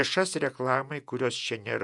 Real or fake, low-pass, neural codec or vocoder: fake; 10.8 kHz; vocoder, 48 kHz, 128 mel bands, Vocos